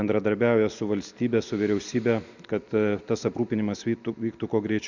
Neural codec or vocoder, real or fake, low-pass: none; real; 7.2 kHz